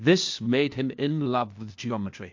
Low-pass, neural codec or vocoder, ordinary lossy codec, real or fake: 7.2 kHz; codec, 16 kHz, 0.8 kbps, ZipCodec; MP3, 48 kbps; fake